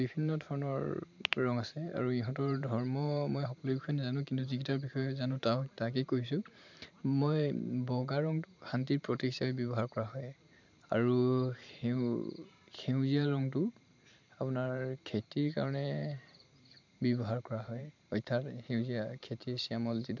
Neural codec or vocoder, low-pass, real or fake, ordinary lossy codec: vocoder, 44.1 kHz, 128 mel bands every 512 samples, BigVGAN v2; 7.2 kHz; fake; MP3, 64 kbps